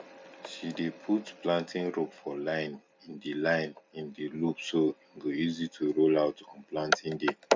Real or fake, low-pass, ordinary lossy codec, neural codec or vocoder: real; none; none; none